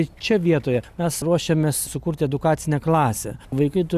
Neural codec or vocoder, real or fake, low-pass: none; real; 14.4 kHz